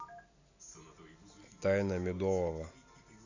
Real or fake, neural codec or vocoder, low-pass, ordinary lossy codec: real; none; 7.2 kHz; none